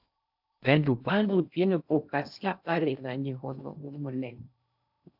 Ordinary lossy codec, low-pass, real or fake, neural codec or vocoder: AAC, 48 kbps; 5.4 kHz; fake; codec, 16 kHz in and 24 kHz out, 0.6 kbps, FocalCodec, streaming, 2048 codes